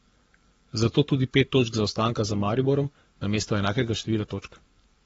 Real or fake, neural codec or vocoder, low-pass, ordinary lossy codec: fake; codec, 44.1 kHz, 7.8 kbps, Pupu-Codec; 19.8 kHz; AAC, 24 kbps